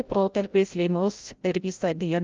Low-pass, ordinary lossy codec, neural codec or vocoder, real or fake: 7.2 kHz; Opus, 32 kbps; codec, 16 kHz, 0.5 kbps, FreqCodec, larger model; fake